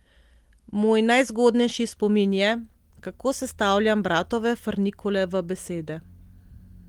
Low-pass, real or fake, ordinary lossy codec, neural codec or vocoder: 19.8 kHz; real; Opus, 24 kbps; none